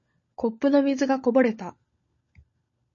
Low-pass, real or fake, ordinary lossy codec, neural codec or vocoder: 7.2 kHz; fake; MP3, 32 kbps; codec, 16 kHz, 16 kbps, FunCodec, trained on LibriTTS, 50 frames a second